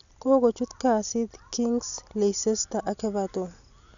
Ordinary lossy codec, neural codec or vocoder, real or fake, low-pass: none; none; real; 7.2 kHz